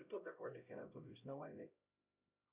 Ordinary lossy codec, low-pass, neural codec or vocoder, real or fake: Opus, 64 kbps; 3.6 kHz; codec, 16 kHz, 0.5 kbps, X-Codec, HuBERT features, trained on LibriSpeech; fake